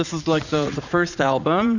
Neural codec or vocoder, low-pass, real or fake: vocoder, 44.1 kHz, 80 mel bands, Vocos; 7.2 kHz; fake